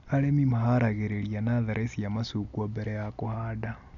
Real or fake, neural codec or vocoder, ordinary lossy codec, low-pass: real; none; none; 7.2 kHz